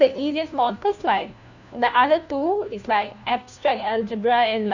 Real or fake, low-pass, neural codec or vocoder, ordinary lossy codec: fake; 7.2 kHz; codec, 16 kHz, 1 kbps, FunCodec, trained on LibriTTS, 50 frames a second; none